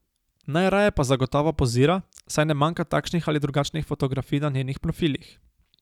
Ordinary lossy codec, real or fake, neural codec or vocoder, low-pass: none; real; none; 19.8 kHz